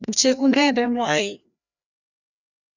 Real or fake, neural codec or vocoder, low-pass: fake; codec, 16 kHz, 1 kbps, FreqCodec, larger model; 7.2 kHz